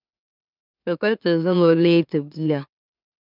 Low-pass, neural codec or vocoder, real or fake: 5.4 kHz; autoencoder, 44.1 kHz, a latent of 192 numbers a frame, MeloTTS; fake